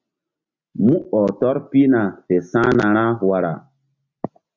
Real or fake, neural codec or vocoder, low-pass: real; none; 7.2 kHz